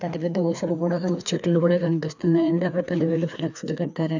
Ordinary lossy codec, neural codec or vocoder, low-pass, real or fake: none; codec, 16 kHz, 2 kbps, FreqCodec, larger model; 7.2 kHz; fake